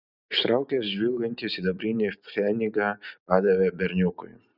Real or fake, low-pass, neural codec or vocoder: real; 5.4 kHz; none